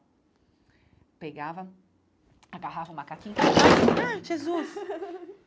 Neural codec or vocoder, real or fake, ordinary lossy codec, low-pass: none; real; none; none